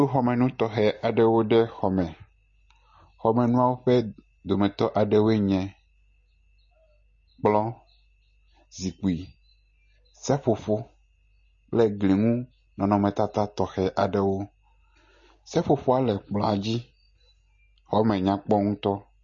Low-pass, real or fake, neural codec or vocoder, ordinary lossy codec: 7.2 kHz; real; none; MP3, 32 kbps